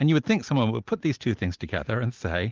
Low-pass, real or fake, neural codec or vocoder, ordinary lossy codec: 7.2 kHz; real; none; Opus, 32 kbps